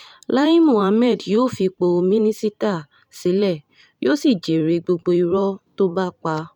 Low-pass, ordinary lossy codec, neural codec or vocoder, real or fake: 19.8 kHz; none; vocoder, 44.1 kHz, 128 mel bands every 512 samples, BigVGAN v2; fake